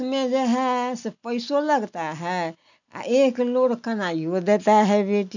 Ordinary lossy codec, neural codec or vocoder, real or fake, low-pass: none; none; real; 7.2 kHz